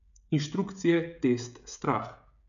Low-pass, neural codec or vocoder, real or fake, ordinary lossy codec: 7.2 kHz; codec, 16 kHz, 16 kbps, FreqCodec, smaller model; fake; none